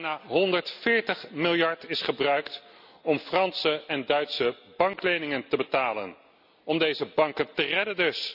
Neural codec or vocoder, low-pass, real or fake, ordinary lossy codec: none; 5.4 kHz; real; none